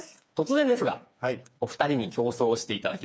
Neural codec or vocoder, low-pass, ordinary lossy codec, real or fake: codec, 16 kHz, 4 kbps, FreqCodec, smaller model; none; none; fake